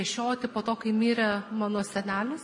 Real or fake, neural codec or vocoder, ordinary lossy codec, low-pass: real; none; MP3, 48 kbps; 10.8 kHz